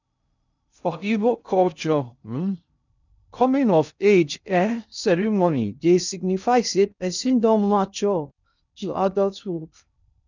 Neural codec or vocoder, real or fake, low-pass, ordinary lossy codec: codec, 16 kHz in and 24 kHz out, 0.6 kbps, FocalCodec, streaming, 2048 codes; fake; 7.2 kHz; none